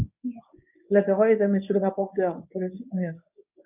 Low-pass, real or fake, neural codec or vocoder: 3.6 kHz; fake; codec, 24 kHz, 0.9 kbps, WavTokenizer, medium speech release version 1